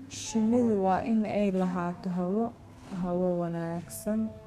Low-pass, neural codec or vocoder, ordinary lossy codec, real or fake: 14.4 kHz; codec, 32 kHz, 1.9 kbps, SNAC; none; fake